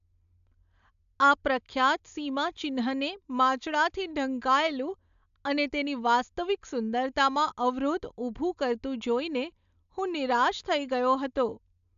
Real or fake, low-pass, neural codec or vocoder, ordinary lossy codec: real; 7.2 kHz; none; AAC, 96 kbps